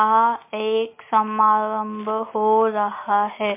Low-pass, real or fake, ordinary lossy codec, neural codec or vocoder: 3.6 kHz; real; MP3, 24 kbps; none